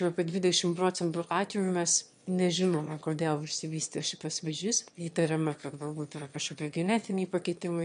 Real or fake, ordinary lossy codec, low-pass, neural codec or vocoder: fake; MP3, 64 kbps; 9.9 kHz; autoencoder, 22.05 kHz, a latent of 192 numbers a frame, VITS, trained on one speaker